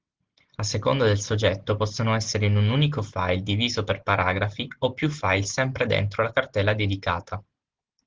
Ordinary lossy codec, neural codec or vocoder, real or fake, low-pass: Opus, 16 kbps; none; real; 7.2 kHz